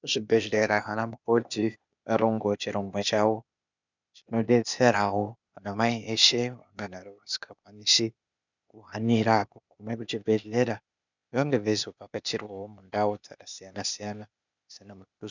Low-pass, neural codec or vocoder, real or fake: 7.2 kHz; codec, 16 kHz, 0.8 kbps, ZipCodec; fake